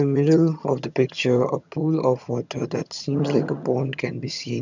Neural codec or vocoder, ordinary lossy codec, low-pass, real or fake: vocoder, 22.05 kHz, 80 mel bands, HiFi-GAN; none; 7.2 kHz; fake